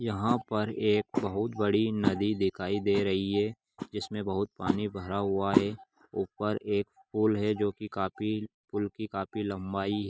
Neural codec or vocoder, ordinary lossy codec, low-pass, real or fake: none; none; none; real